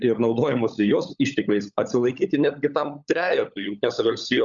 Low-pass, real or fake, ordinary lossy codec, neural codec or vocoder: 7.2 kHz; fake; Opus, 64 kbps; codec, 16 kHz, 16 kbps, FunCodec, trained on LibriTTS, 50 frames a second